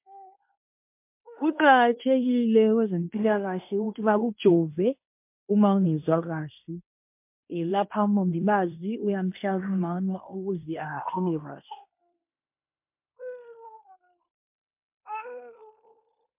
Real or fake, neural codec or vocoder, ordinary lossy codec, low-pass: fake; codec, 16 kHz in and 24 kHz out, 0.9 kbps, LongCat-Audio-Codec, fine tuned four codebook decoder; MP3, 32 kbps; 3.6 kHz